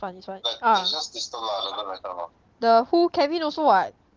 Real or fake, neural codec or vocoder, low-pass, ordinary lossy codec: real; none; 7.2 kHz; Opus, 16 kbps